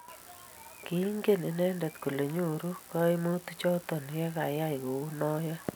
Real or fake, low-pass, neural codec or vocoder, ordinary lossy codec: real; none; none; none